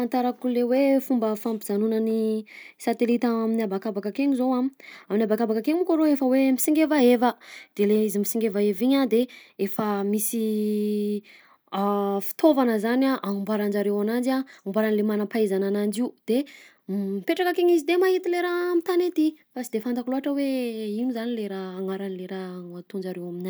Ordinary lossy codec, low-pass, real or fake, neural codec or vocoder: none; none; real; none